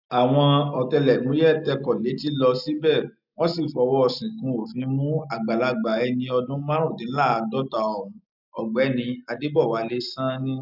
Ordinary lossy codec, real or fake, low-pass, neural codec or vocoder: none; real; 5.4 kHz; none